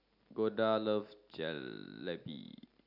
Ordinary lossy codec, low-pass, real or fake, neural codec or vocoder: none; 5.4 kHz; real; none